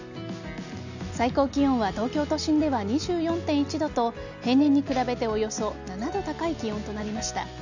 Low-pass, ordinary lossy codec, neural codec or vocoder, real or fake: 7.2 kHz; none; none; real